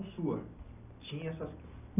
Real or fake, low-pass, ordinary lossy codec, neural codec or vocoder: real; 3.6 kHz; none; none